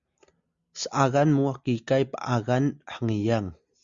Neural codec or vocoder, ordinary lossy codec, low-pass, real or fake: none; Opus, 64 kbps; 7.2 kHz; real